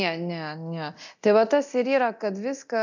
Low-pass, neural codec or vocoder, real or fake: 7.2 kHz; codec, 24 kHz, 0.9 kbps, DualCodec; fake